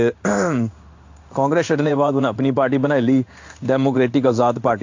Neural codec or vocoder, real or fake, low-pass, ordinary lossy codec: codec, 16 kHz in and 24 kHz out, 1 kbps, XY-Tokenizer; fake; 7.2 kHz; AAC, 48 kbps